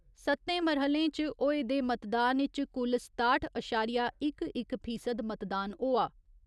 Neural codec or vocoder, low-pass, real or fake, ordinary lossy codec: none; none; real; none